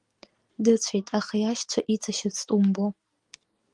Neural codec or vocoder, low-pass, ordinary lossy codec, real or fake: none; 10.8 kHz; Opus, 24 kbps; real